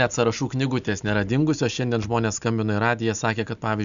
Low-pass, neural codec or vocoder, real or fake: 7.2 kHz; none; real